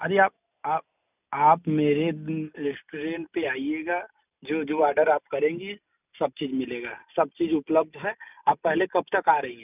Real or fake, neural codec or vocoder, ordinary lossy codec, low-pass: real; none; none; 3.6 kHz